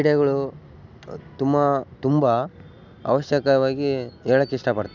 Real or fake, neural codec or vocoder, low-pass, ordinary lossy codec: real; none; 7.2 kHz; none